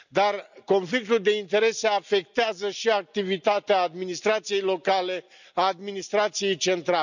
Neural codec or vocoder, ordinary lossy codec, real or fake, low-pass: none; none; real; 7.2 kHz